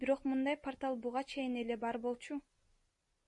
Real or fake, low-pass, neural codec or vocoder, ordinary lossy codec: real; 10.8 kHz; none; MP3, 48 kbps